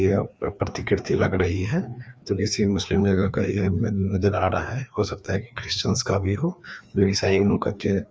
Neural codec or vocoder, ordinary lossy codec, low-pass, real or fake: codec, 16 kHz, 2 kbps, FreqCodec, larger model; none; none; fake